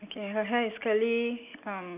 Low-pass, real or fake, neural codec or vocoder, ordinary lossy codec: 3.6 kHz; real; none; none